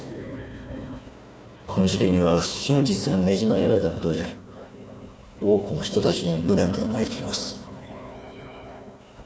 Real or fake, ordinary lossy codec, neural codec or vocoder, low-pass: fake; none; codec, 16 kHz, 1 kbps, FunCodec, trained on Chinese and English, 50 frames a second; none